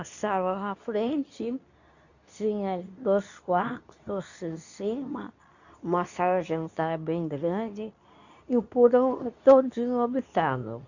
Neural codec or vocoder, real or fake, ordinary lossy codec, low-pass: codec, 24 kHz, 0.9 kbps, WavTokenizer, medium speech release version 2; fake; none; 7.2 kHz